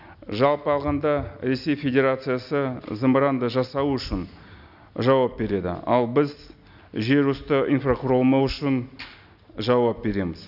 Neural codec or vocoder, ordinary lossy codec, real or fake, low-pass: none; none; real; 5.4 kHz